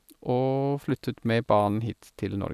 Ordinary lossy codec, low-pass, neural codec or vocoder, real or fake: none; 14.4 kHz; none; real